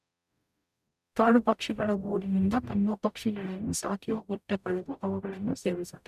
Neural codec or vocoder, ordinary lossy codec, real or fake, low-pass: codec, 44.1 kHz, 0.9 kbps, DAC; none; fake; 14.4 kHz